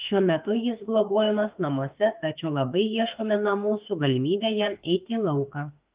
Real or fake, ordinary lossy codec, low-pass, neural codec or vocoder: fake; Opus, 32 kbps; 3.6 kHz; autoencoder, 48 kHz, 32 numbers a frame, DAC-VAE, trained on Japanese speech